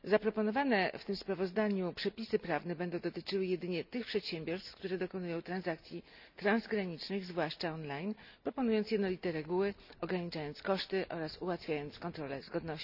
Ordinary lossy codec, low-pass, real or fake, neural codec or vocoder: none; 5.4 kHz; real; none